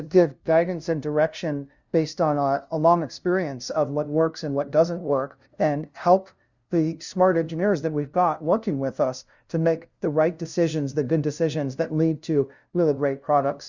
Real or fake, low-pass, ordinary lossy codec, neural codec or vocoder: fake; 7.2 kHz; Opus, 64 kbps; codec, 16 kHz, 0.5 kbps, FunCodec, trained on LibriTTS, 25 frames a second